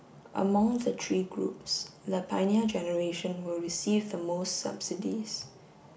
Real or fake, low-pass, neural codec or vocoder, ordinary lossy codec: real; none; none; none